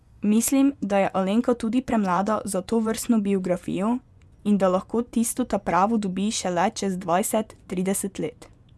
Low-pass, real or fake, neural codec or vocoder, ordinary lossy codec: none; fake; vocoder, 24 kHz, 100 mel bands, Vocos; none